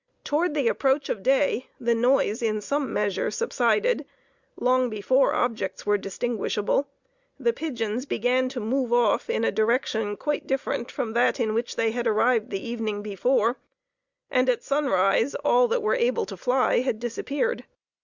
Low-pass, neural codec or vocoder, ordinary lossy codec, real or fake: 7.2 kHz; none; Opus, 64 kbps; real